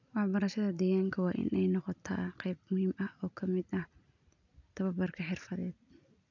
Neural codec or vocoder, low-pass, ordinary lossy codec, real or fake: none; 7.2 kHz; none; real